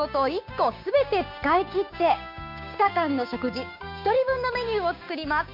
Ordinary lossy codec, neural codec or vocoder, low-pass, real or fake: MP3, 48 kbps; codec, 16 kHz, 6 kbps, DAC; 5.4 kHz; fake